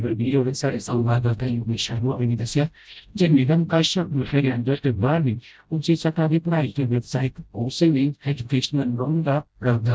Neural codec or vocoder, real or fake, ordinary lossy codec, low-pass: codec, 16 kHz, 0.5 kbps, FreqCodec, smaller model; fake; none; none